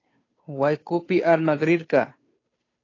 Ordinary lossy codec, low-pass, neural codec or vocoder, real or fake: AAC, 32 kbps; 7.2 kHz; codec, 16 kHz, 2 kbps, FunCodec, trained on Chinese and English, 25 frames a second; fake